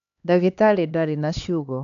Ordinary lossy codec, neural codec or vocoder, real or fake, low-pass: none; codec, 16 kHz, 2 kbps, X-Codec, HuBERT features, trained on LibriSpeech; fake; 7.2 kHz